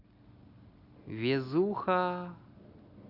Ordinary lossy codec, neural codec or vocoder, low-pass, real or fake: none; none; 5.4 kHz; real